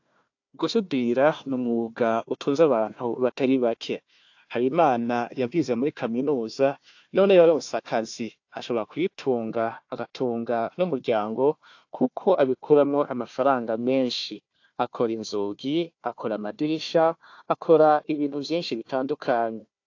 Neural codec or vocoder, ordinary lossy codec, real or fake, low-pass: codec, 16 kHz, 1 kbps, FunCodec, trained on Chinese and English, 50 frames a second; AAC, 48 kbps; fake; 7.2 kHz